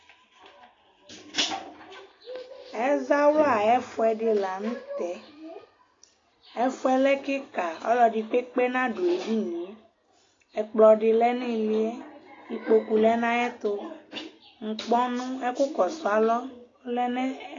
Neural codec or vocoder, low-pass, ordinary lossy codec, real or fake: none; 7.2 kHz; AAC, 32 kbps; real